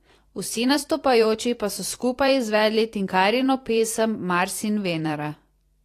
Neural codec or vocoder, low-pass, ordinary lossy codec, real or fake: vocoder, 44.1 kHz, 128 mel bands every 512 samples, BigVGAN v2; 14.4 kHz; AAC, 48 kbps; fake